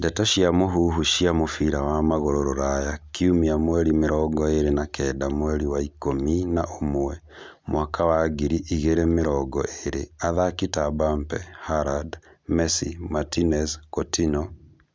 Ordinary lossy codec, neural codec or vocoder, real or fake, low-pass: none; none; real; none